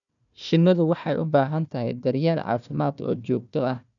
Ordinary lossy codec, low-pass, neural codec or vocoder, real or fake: none; 7.2 kHz; codec, 16 kHz, 1 kbps, FunCodec, trained on Chinese and English, 50 frames a second; fake